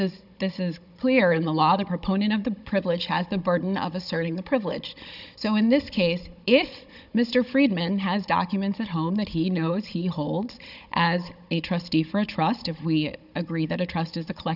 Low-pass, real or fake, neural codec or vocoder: 5.4 kHz; fake; codec, 16 kHz, 16 kbps, FunCodec, trained on Chinese and English, 50 frames a second